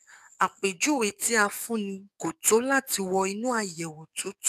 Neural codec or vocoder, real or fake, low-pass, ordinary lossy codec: codec, 44.1 kHz, 7.8 kbps, DAC; fake; 14.4 kHz; none